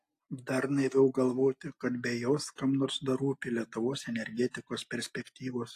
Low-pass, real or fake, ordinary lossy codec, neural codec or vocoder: 14.4 kHz; real; AAC, 64 kbps; none